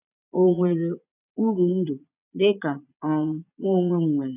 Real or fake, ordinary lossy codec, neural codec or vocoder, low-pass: fake; none; vocoder, 22.05 kHz, 80 mel bands, Vocos; 3.6 kHz